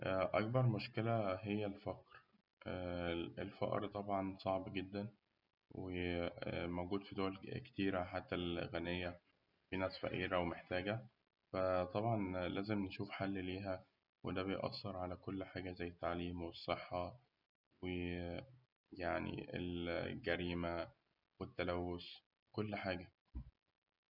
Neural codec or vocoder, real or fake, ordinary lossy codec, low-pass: none; real; none; 5.4 kHz